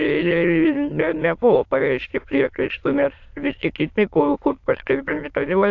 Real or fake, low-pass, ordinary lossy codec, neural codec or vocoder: fake; 7.2 kHz; AAC, 48 kbps; autoencoder, 22.05 kHz, a latent of 192 numbers a frame, VITS, trained on many speakers